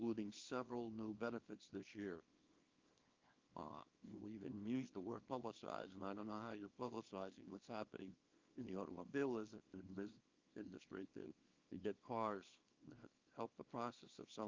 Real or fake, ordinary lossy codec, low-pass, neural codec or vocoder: fake; Opus, 24 kbps; 7.2 kHz; codec, 24 kHz, 0.9 kbps, WavTokenizer, small release